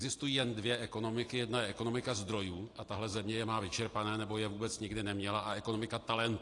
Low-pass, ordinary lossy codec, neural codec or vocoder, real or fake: 10.8 kHz; AAC, 48 kbps; none; real